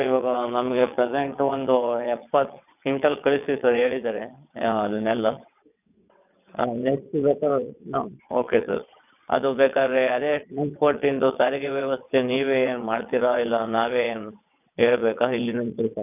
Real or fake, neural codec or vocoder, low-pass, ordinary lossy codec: fake; vocoder, 22.05 kHz, 80 mel bands, WaveNeXt; 3.6 kHz; none